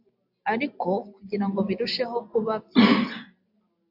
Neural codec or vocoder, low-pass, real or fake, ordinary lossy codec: none; 5.4 kHz; real; AAC, 48 kbps